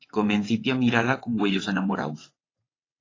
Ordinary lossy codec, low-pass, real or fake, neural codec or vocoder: AAC, 32 kbps; 7.2 kHz; fake; vocoder, 22.05 kHz, 80 mel bands, WaveNeXt